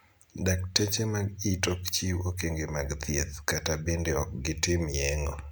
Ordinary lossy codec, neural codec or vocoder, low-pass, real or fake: none; none; none; real